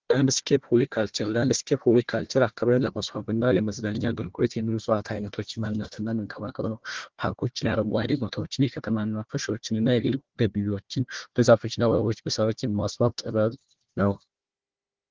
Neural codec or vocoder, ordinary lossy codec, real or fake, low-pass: codec, 16 kHz, 1 kbps, FunCodec, trained on Chinese and English, 50 frames a second; Opus, 16 kbps; fake; 7.2 kHz